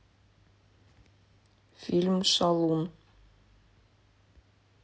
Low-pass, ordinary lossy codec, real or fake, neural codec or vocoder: none; none; real; none